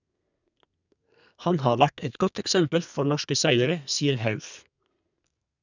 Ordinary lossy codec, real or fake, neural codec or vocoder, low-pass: none; fake; codec, 32 kHz, 1.9 kbps, SNAC; 7.2 kHz